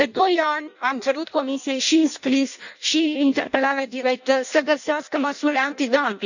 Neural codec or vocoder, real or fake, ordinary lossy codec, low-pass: codec, 16 kHz in and 24 kHz out, 0.6 kbps, FireRedTTS-2 codec; fake; none; 7.2 kHz